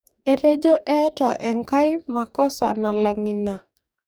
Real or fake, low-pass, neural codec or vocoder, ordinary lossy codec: fake; none; codec, 44.1 kHz, 2.6 kbps, DAC; none